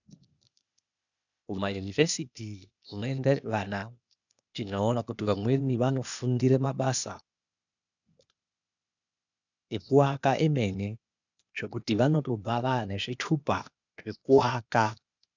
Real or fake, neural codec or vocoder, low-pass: fake; codec, 16 kHz, 0.8 kbps, ZipCodec; 7.2 kHz